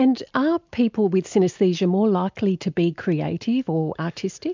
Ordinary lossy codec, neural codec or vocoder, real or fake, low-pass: MP3, 64 kbps; none; real; 7.2 kHz